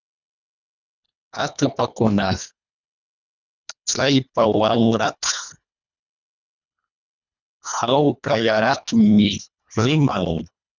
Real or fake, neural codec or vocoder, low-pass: fake; codec, 24 kHz, 1.5 kbps, HILCodec; 7.2 kHz